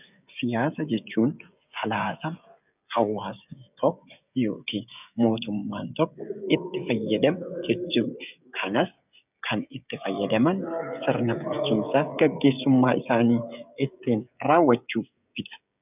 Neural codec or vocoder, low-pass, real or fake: codec, 16 kHz, 16 kbps, FreqCodec, smaller model; 3.6 kHz; fake